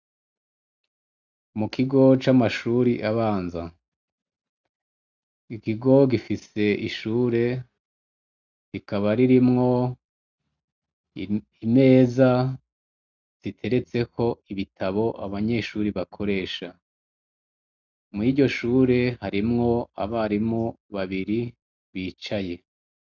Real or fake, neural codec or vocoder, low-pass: real; none; 7.2 kHz